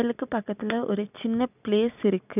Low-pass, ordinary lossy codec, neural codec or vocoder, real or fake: 3.6 kHz; none; none; real